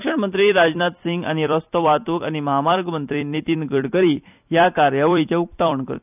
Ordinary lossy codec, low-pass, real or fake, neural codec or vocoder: none; 3.6 kHz; fake; vocoder, 44.1 kHz, 128 mel bands every 256 samples, BigVGAN v2